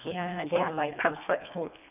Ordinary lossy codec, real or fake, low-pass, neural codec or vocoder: none; fake; 3.6 kHz; codec, 24 kHz, 1.5 kbps, HILCodec